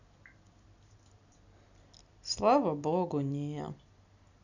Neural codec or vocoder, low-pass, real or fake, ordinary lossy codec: none; 7.2 kHz; real; none